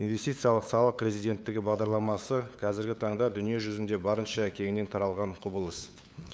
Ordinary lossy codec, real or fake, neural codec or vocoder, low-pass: none; fake; codec, 16 kHz, 8 kbps, FunCodec, trained on LibriTTS, 25 frames a second; none